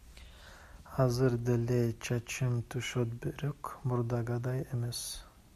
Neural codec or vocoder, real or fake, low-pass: none; real; 14.4 kHz